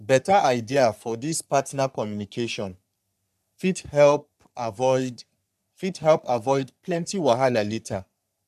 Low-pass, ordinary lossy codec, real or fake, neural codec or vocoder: 14.4 kHz; none; fake; codec, 44.1 kHz, 3.4 kbps, Pupu-Codec